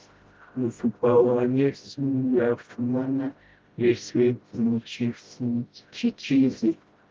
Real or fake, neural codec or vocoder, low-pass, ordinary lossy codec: fake; codec, 16 kHz, 0.5 kbps, FreqCodec, smaller model; 7.2 kHz; Opus, 32 kbps